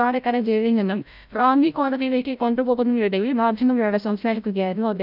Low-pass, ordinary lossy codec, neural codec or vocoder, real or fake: 5.4 kHz; none; codec, 16 kHz, 0.5 kbps, FreqCodec, larger model; fake